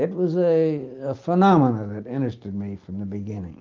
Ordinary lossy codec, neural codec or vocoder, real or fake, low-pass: Opus, 24 kbps; none; real; 7.2 kHz